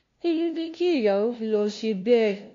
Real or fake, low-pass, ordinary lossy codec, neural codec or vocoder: fake; 7.2 kHz; AAC, 48 kbps; codec, 16 kHz, 0.5 kbps, FunCodec, trained on LibriTTS, 25 frames a second